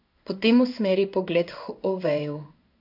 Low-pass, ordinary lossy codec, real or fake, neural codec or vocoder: 5.4 kHz; none; fake; codec, 16 kHz in and 24 kHz out, 1 kbps, XY-Tokenizer